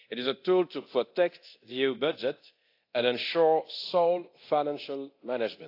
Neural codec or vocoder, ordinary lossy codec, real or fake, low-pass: codec, 24 kHz, 0.9 kbps, DualCodec; AAC, 32 kbps; fake; 5.4 kHz